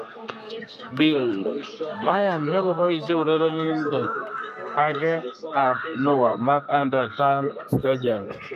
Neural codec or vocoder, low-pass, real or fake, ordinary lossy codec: codec, 32 kHz, 1.9 kbps, SNAC; 14.4 kHz; fake; none